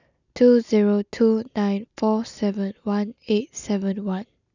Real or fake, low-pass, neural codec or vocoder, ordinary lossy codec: real; 7.2 kHz; none; none